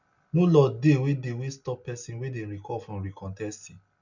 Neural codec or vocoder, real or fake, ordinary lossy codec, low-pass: none; real; none; 7.2 kHz